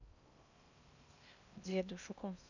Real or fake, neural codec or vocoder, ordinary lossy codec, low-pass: fake; codec, 16 kHz in and 24 kHz out, 0.6 kbps, FocalCodec, streaming, 2048 codes; none; 7.2 kHz